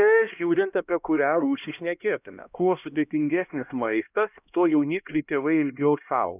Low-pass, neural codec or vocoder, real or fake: 3.6 kHz; codec, 16 kHz, 1 kbps, X-Codec, HuBERT features, trained on LibriSpeech; fake